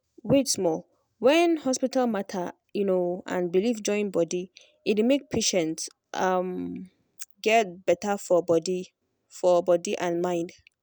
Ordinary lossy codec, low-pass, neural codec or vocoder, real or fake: none; none; none; real